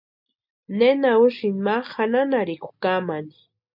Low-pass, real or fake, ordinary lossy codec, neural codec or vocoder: 5.4 kHz; real; MP3, 48 kbps; none